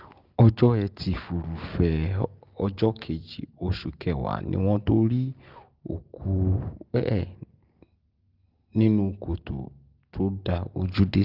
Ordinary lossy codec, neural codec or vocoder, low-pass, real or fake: Opus, 32 kbps; none; 5.4 kHz; real